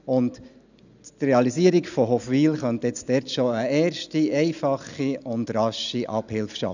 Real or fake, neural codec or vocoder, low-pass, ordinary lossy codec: real; none; 7.2 kHz; none